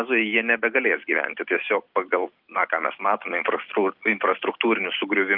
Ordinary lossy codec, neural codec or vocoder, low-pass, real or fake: Opus, 24 kbps; none; 5.4 kHz; real